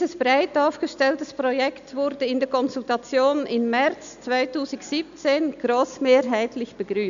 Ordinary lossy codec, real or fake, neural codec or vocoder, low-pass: none; real; none; 7.2 kHz